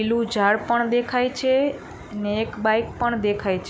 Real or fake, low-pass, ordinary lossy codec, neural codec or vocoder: real; none; none; none